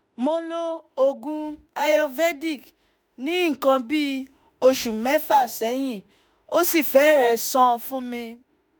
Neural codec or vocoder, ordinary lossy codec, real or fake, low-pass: autoencoder, 48 kHz, 32 numbers a frame, DAC-VAE, trained on Japanese speech; none; fake; none